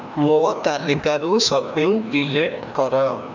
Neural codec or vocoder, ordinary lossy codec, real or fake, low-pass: codec, 16 kHz, 1 kbps, FreqCodec, larger model; none; fake; 7.2 kHz